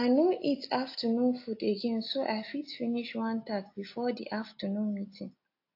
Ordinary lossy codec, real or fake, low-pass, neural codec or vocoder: AAC, 32 kbps; real; 5.4 kHz; none